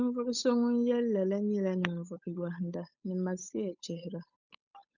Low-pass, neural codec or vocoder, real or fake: 7.2 kHz; codec, 16 kHz, 8 kbps, FunCodec, trained on Chinese and English, 25 frames a second; fake